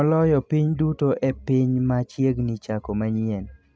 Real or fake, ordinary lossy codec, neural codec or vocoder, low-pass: real; none; none; none